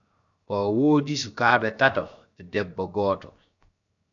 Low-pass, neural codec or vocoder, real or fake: 7.2 kHz; codec, 16 kHz, 0.7 kbps, FocalCodec; fake